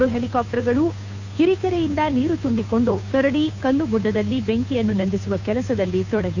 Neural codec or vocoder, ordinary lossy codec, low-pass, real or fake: codec, 16 kHz, 2 kbps, FunCodec, trained on Chinese and English, 25 frames a second; none; 7.2 kHz; fake